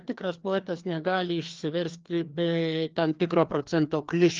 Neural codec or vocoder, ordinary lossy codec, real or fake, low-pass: codec, 16 kHz, 2 kbps, FreqCodec, larger model; Opus, 32 kbps; fake; 7.2 kHz